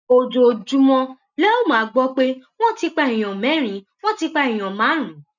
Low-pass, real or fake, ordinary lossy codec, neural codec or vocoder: 7.2 kHz; real; none; none